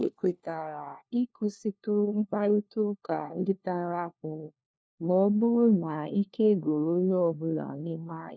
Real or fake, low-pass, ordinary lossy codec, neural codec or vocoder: fake; none; none; codec, 16 kHz, 1 kbps, FunCodec, trained on LibriTTS, 50 frames a second